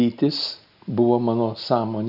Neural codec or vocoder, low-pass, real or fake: none; 5.4 kHz; real